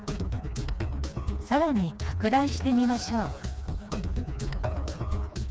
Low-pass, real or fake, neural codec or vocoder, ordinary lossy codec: none; fake; codec, 16 kHz, 2 kbps, FreqCodec, smaller model; none